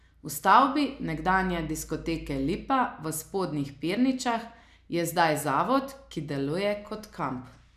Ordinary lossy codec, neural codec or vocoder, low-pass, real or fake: none; none; 14.4 kHz; real